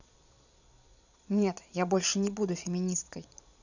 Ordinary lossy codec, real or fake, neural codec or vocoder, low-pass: none; fake; vocoder, 22.05 kHz, 80 mel bands, Vocos; 7.2 kHz